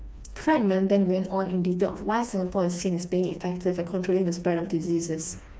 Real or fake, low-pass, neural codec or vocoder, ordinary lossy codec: fake; none; codec, 16 kHz, 2 kbps, FreqCodec, smaller model; none